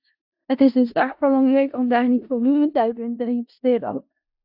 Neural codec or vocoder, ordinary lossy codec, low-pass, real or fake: codec, 16 kHz in and 24 kHz out, 0.4 kbps, LongCat-Audio-Codec, four codebook decoder; AAC, 48 kbps; 5.4 kHz; fake